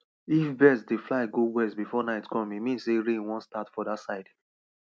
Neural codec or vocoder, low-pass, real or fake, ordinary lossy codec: none; none; real; none